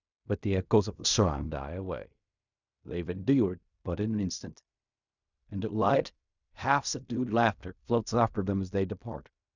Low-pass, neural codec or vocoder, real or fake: 7.2 kHz; codec, 16 kHz in and 24 kHz out, 0.4 kbps, LongCat-Audio-Codec, fine tuned four codebook decoder; fake